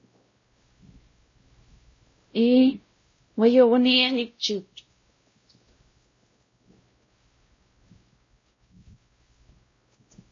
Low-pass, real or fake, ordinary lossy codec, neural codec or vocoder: 7.2 kHz; fake; MP3, 32 kbps; codec, 16 kHz, 0.5 kbps, X-Codec, WavLM features, trained on Multilingual LibriSpeech